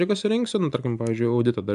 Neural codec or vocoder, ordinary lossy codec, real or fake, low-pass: none; AAC, 96 kbps; real; 10.8 kHz